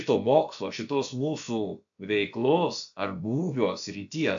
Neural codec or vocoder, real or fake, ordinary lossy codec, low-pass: codec, 16 kHz, about 1 kbps, DyCAST, with the encoder's durations; fake; AAC, 64 kbps; 7.2 kHz